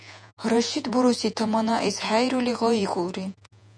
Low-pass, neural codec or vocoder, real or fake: 9.9 kHz; vocoder, 48 kHz, 128 mel bands, Vocos; fake